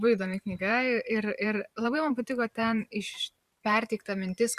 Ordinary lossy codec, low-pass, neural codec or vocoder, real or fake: Opus, 64 kbps; 14.4 kHz; vocoder, 44.1 kHz, 128 mel bands every 512 samples, BigVGAN v2; fake